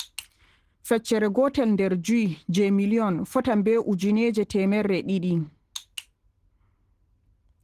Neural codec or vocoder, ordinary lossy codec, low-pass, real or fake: none; Opus, 16 kbps; 14.4 kHz; real